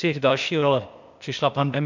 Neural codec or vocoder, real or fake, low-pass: codec, 16 kHz, 0.8 kbps, ZipCodec; fake; 7.2 kHz